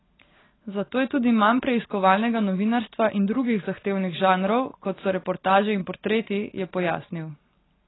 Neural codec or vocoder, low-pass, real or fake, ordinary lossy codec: none; 7.2 kHz; real; AAC, 16 kbps